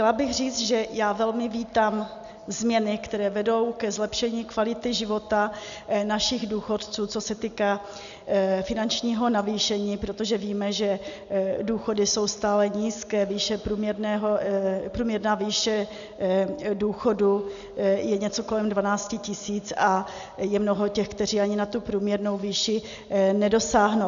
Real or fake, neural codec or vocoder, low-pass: real; none; 7.2 kHz